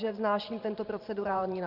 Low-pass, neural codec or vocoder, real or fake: 5.4 kHz; vocoder, 44.1 kHz, 128 mel bands every 512 samples, BigVGAN v2; fake